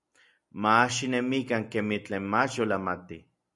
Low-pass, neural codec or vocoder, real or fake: 10.8 kHz; none; real